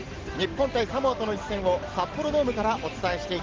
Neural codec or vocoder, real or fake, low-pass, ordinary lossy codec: none; real; 7.2 kHz; Opus, 16 kbps